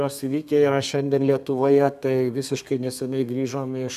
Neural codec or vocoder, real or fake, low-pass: codec, 32 kHz, 1.9 kbps, SNAC; fake; 14.4 kHz